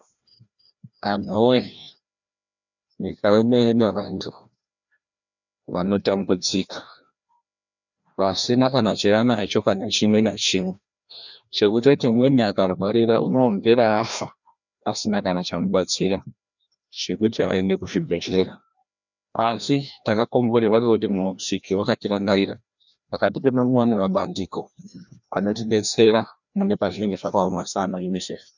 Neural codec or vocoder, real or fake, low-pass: codec, 16 kHz, 1 kbps, FreqCodec, larger model; fake; 7.2 kHz